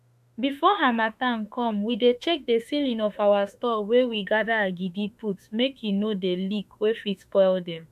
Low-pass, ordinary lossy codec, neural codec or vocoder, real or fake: 14.4 kHz; MP3, 96 kbps; autoencoder, 48 kHz, 32 numbers a frame, DAC-VAE, trained on Japanese speech; fake